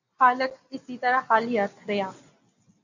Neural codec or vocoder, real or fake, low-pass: none; real; 7.2 kHz